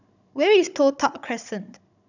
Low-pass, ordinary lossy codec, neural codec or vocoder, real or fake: 7.2 kHz; none; codec, 16 kHz, 16 kbps, FunCodec, trained on Chinese and English, 50 frames a second; fake